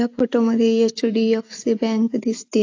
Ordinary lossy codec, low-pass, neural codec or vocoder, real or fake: AAC, 48 kbps; 7.2 kHz; none; real